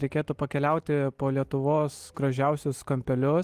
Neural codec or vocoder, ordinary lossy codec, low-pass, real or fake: none; Opus, 24 kbps; 14.4 kHz; real